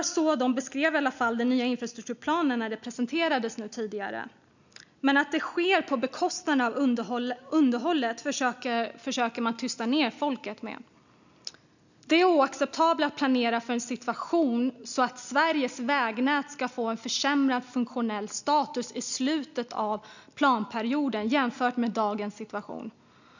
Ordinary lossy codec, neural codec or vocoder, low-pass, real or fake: none; none; 7.2 kHz; real